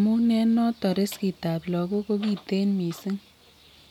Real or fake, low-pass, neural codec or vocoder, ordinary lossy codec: real; 19.8 kHz; none; MP3, 96 kbps